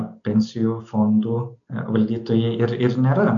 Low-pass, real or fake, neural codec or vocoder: 7.2 kHz; real; none